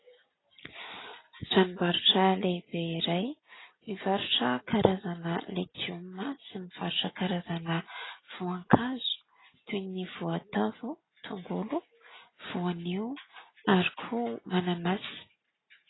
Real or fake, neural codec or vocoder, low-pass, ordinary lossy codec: real; none; 7.2 kHz; AAC, 16 kbps